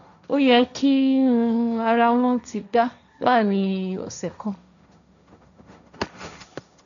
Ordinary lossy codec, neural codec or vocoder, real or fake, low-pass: none; codec, 16 kHz, 1.1 kbps, Voila-Tokenizer; fake; 7.2 kHz